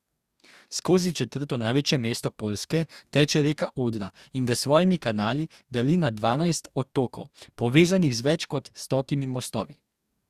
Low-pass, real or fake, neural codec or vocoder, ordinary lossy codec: 14.4 kHz; fake; codec, 44.1 kHz, 2.6 kbps, DAC; Opus, 64 kbps